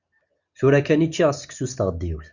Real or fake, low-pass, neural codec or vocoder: real; 7.2 kHz; none